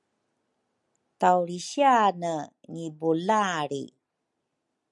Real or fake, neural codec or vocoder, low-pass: real; none; 10.8 kHz